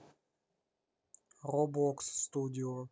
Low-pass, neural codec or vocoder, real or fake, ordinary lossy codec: none; none; real; none